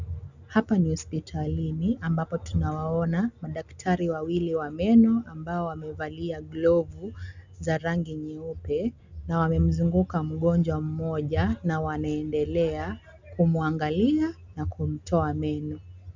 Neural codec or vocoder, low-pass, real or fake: none; 7.2 kHz; real